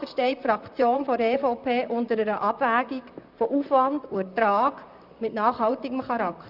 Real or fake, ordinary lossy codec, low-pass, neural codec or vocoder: fake; none; 5.4 kHz; vocoder, 44.1 kHz, 128 mel bands, Pupu-Vocoder